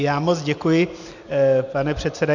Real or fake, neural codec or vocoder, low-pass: real; none; 7.2 kHz